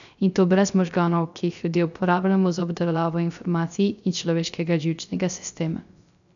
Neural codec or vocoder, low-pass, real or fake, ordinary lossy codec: codec, 16 kHz, 0.3 kbps, FocalCodec; 7.2 kHz; fake; none